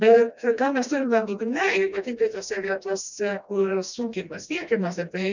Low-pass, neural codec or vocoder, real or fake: 7.2 kHz; codec, 16 kHz, 1 kbps, FreqCodec, smaller model; fake